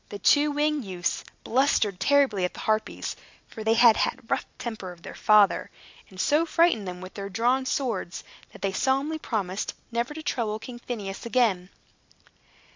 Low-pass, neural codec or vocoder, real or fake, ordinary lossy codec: 7.2 kHz; none; real; MP3, 64 kbps